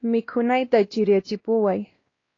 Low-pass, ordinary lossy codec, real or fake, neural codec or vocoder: 7.2 kHz; AAC, 32 kbps; fake; codec, 16 kHz, 1 kbps, X-Codec, WavLM features, trained on Multilingual LibriSpeech